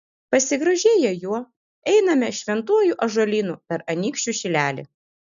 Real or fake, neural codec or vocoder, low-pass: real; none; 7.2 kHz